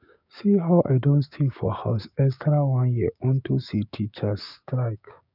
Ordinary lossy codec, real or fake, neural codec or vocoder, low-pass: none; fake; codec, 16 kHz, 6 kbps, DAC; 5.4 kHz